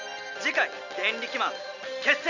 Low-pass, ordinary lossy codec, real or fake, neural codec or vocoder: 7.2 kHz; none; real; none